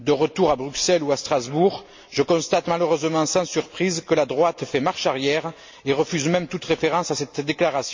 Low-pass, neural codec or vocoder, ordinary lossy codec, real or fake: 7.2 kHz; none; MP3, 48 kbps; real